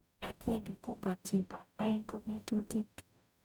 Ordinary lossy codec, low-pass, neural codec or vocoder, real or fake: none; 19.8 kHz; codec, 44.1 kHz, 0.9 kbps, DAC; fake